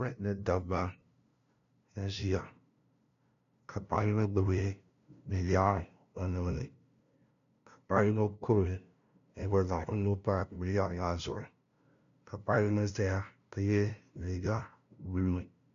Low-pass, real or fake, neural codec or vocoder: 7.2 kHz; fake; codec, 16 kHz, 0.5 kbps, FunCodec, trained on LibriTTS, 25 frames a second